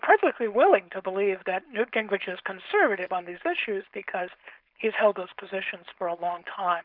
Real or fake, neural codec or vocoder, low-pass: fake; codec, 16 kHz, 4.8 kbps, FACodec; 5.4 kHz